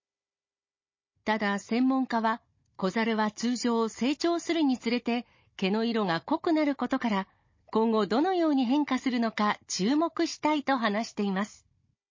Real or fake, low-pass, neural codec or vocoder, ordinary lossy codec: fake; 7.2 kHz; codec, 16 kHz, 16 kbps, FunCodec, trained on Chinese and English, 50 frames a second; MP3, 32 kbps